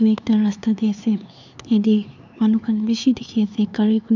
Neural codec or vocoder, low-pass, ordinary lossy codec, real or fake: codec, 16 kHz, 4 kbps, X-Codec, HuBERT features, trained on LibriSpeech; 7.2 kHz; none; fake